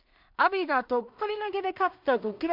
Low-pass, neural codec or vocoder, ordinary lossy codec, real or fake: 5.4 kHz; codec, 16 kHz in and 24 kHz out, 0.4 kbps, LongCat-Audio-Codec, two codebook decoder; MP3, 48 kbps; fake